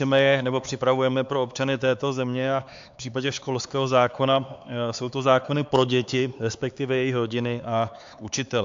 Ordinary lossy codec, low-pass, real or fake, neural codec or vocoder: AAC, 64 kbps; 7.2 kHz; fake; codec, 16 kHz, 4 kbps, X-Codec, HuBERT features, trained on LibriSpeech